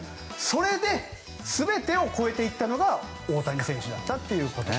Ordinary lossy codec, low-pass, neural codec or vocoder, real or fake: none; none; none; real